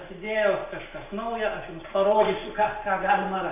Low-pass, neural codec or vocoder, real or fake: 3.6 kHz; none; real